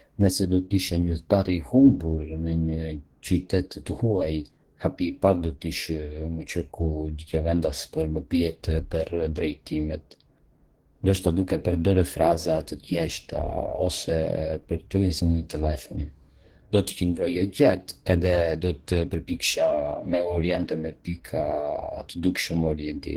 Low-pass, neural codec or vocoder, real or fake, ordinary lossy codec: 19.8 kHz; codec, 44.1 kHz, 2.6 kbps, DAC; fake; Opus, 16 kbps